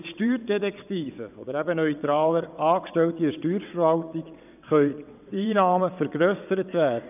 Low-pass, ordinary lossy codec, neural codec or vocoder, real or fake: 3.6 kHz; none; vocoder, 22.05 kHz, 80 mel bands, WaveNeXt; fake